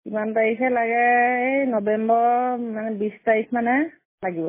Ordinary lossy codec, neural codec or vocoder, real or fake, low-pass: MP3, 16 kbps; none; real; 3.6 kHz